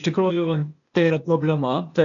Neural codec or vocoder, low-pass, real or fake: codec, 16 kHz, 0.8 kbps, ZipCodec; 7.2 kHz; fake